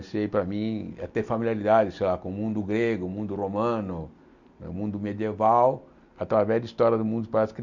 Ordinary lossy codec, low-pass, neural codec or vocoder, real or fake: none; 7.2 kHz; none; real